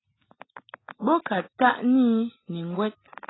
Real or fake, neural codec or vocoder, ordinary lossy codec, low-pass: real; none; AAC, 16 kbps; 7.2 kHz